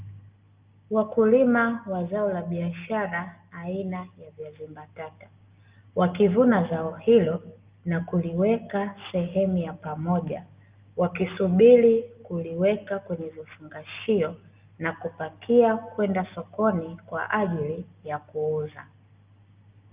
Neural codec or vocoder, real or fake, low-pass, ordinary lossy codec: none; real; 3.6 kHz; Opus, 24 kbps